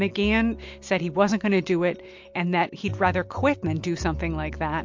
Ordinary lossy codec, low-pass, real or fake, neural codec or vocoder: MP3, 48 kbps; 7.2 kHz; real; none